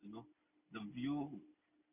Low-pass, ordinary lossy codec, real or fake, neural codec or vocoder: 3.6 kHz; none; real; none